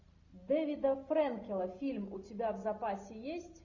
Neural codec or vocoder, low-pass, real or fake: none; 7.2 kHz; real